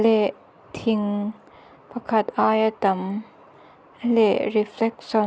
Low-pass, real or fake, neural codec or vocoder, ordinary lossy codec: none; real; none; none